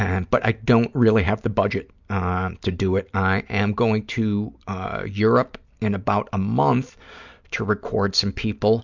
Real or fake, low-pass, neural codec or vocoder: real; 7.2 kHz; none